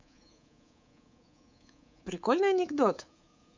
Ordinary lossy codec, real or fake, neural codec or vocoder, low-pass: MP3, 64 kbps; fake; codec, 24 kHz, 3.1 kbps, DualCodec; 7.2 kHz